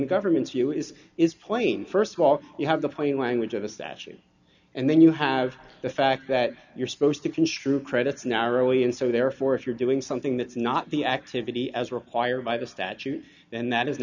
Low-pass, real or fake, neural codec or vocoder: 7.2 kHz; real; none